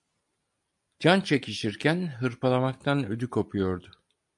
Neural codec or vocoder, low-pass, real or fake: none; 10.8 kHz; real